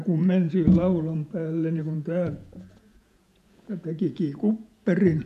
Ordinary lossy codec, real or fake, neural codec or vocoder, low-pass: none; fake; vocoder, 48 kHz, 128 mel bands, Vocos; 14.4 kHz